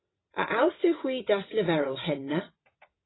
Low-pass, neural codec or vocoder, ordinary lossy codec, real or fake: 7.2 kHz; vocoder, 22.05 kHz, 80 mel bands, WaveNeXt; AAC, 16 kbps; fake